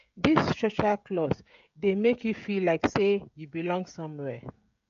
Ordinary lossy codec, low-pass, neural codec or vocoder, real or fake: MP3, 48 kbps; 7.2 kHz; codec, 16 kHz, 16 kbps, FreqCodec, smaller model; fake